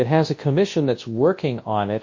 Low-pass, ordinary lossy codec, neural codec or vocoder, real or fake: 7.2 kHz; MP3, 32 kbps; codec, 24 kHz, 0.9 kbps, WavTokenizer, large speech release; fake